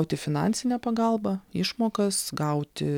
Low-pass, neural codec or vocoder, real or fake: 19.8 kHz; none; real